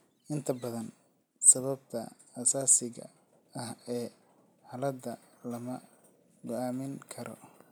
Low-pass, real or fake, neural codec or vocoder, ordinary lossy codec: none; real; none; none